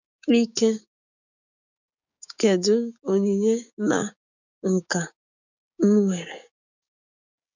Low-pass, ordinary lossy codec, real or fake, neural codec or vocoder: 7.2 kHz; none; fake; codec, 16 kHz, 6 kbps, DAC